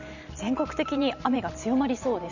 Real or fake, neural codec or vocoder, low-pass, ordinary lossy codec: fake; vocoder, 44.1 kHz, 128 mel bands every 512 samples, BigVGAN v2; 7.2 kHz; none